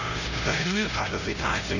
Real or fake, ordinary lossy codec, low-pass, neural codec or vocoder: fake; none; 7.2 kHz; codec, 16 kHz, 0.5 kbps, X-Codec, HuBERT features, trained on LibriSpeech